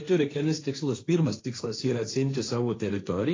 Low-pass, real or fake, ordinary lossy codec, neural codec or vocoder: 7.2 kHz; fake; AAC, 32 kbps; codec, 16 kHz, 1.1 kbps, Voila-Tokenizer